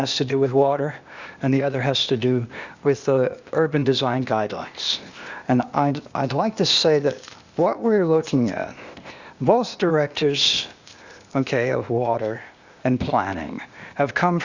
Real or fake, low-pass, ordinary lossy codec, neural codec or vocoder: fake; 7.2 kHz; Opus, 64 kbps; codec, 16 kHz, 0.8 kbps, ZipCodec